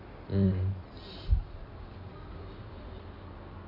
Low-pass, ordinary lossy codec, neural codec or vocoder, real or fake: 5.4 kHz; MP3, 48 kbps; codec, 16 kHz in and 24 kHz out, 2.2 kbps, FireRedTTS-2 codec; fake